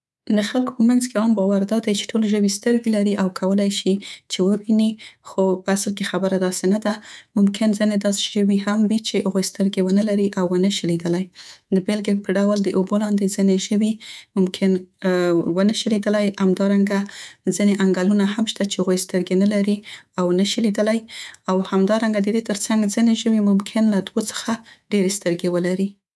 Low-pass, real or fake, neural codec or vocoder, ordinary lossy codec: none; fake; codec, 24 kHz, 3.1 kbps, DualCodec; none